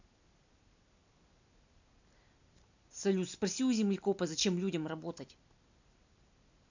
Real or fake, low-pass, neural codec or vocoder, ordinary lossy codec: real; 7.2 kHz; none; none